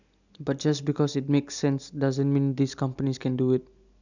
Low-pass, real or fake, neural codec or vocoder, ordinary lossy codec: 7.2 kHz; real; none; none